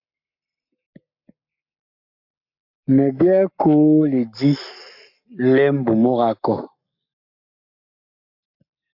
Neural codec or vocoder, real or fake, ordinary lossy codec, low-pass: codec, 44.1 kHz, 7.8 kbps, Pupu-Codec; fake; MP3, 48 kbps; 5.4 kHz